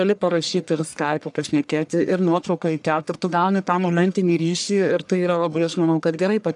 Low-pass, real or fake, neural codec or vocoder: 10.8 kHz; fake; codec, 44.1 kHz, 1.7 kbps, Pupu-Codec